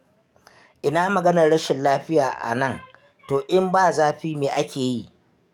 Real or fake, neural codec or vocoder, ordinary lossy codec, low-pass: fake; codec, 44.1 kHz, 7.8 kbps, DAC; none; 19.8 kHz